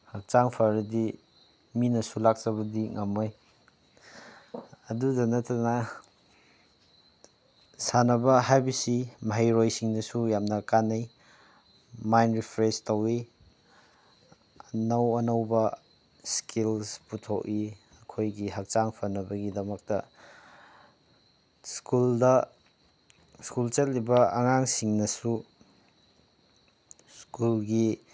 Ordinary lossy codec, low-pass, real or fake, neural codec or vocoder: none; none; real; none